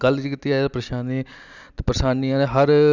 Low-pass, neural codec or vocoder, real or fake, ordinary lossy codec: 7.2 kHz; none; real; none